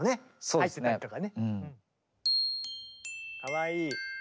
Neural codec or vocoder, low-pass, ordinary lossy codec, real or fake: none; none; none; real